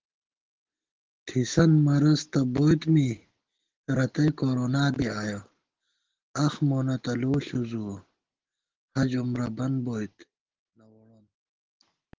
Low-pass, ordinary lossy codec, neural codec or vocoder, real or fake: 7.2 kHz; Opus, 16 kbps; none; real